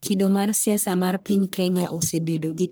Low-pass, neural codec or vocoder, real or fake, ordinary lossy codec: none; codec, 44.1 kHz, 1.7 kbps, Pupu-Codec; fake; none